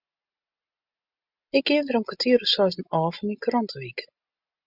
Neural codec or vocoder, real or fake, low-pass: none; real; 5.4 kHz